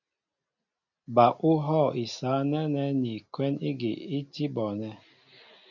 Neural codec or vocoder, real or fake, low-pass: none; real; 7.2 kHz